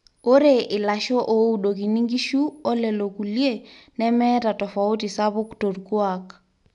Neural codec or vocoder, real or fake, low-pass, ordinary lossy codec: none; real; 10.8 kHz; none